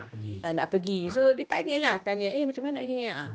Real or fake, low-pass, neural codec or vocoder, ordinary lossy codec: fake; none; codec, 16 kHz, 1 kbps, X-Codec, HuBERT features, trained on general audio; none